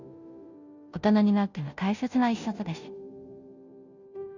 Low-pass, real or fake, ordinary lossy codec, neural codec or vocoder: 7.2 kHz; fake; none; codec, 16 kHz, 0.5 kbps, FunCodec, trained on Chinese and English, 25 frames a second